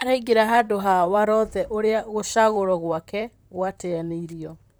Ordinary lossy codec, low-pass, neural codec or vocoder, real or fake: none; none; vocoder, 44.1 kHz, 128 mel bands, Pupu-Vocoder; fake